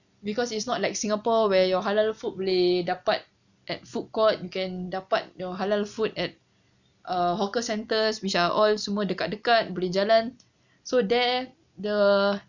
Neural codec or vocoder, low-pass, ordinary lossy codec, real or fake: none; 7.2 kHz; none; real